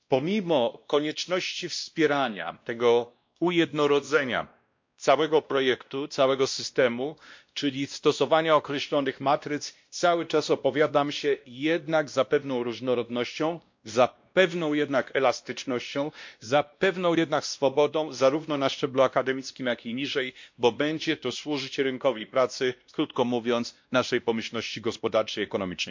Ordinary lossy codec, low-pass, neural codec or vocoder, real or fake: MP3, 48 kbps; 7.2 kHz; codec, 16 kHz, 1 kbps, X-Codec, WavLM features, trained on Multilingual LibriSpeech; fake